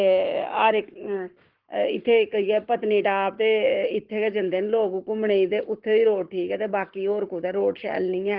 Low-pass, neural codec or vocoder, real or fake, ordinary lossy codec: 5.4 kHz; codec, 44.1 kHz, 7.8 kbps, Pupu-Codec; fake; Opus, 16 kbps